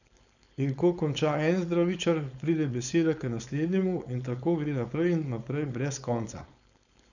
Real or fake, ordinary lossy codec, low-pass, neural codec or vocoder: fake; none; 7.2 kHz; codec, 16 kHz, 4.8 kbps, FACodec